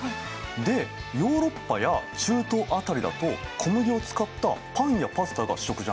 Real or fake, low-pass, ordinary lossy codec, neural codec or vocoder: real; none; none; none